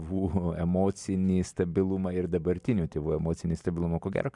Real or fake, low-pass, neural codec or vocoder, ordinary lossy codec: real; 10.8 kHz; none; MP3, 96 kbps